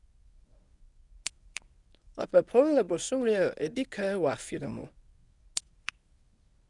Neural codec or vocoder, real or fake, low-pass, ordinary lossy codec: codec, 24 kHz, 0.9 kbps, WavTokenizer, medium speech release version 1; fake; 10.8 kHz; none